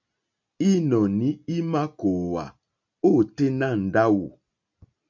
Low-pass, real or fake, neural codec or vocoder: 7.2 kHz; real; none